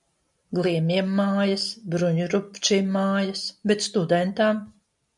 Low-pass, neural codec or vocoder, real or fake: 10.8 kHz; none; real